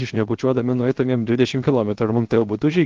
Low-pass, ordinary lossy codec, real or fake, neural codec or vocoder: 7.2 kHz; Opus, 16 kbps; fake; codec, 16 kHz, 0.7 kbps, FocalCodec